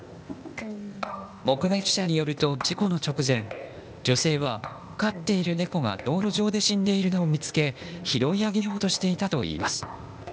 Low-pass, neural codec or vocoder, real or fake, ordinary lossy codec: none; codec, 16 kHz, 0.8 kbps, ZipCodec; fake; none